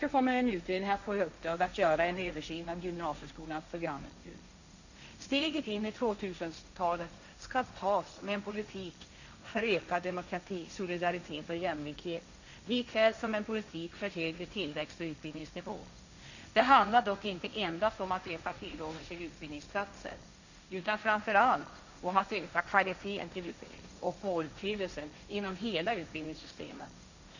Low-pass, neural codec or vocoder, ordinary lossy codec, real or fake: 7.2 kHz; codec, 16 kHz, 1.1 kbps, Voila-Tokenizer; none; fake